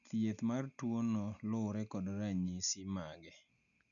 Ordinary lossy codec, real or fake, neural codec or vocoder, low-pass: none; real; none; 7.2 kHz